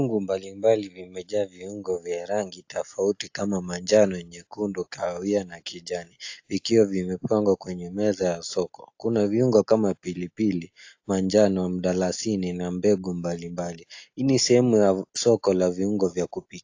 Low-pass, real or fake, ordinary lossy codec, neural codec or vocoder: 7.2 kHz; real; AAC, 48 kbps; none